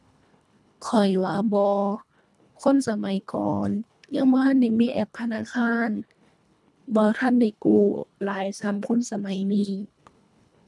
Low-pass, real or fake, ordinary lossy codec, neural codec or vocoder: none; fake; none; codec, 24 kHz, 1.5 kbps, HILCodec